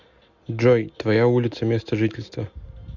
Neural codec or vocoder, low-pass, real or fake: none; 7.2 kHz; real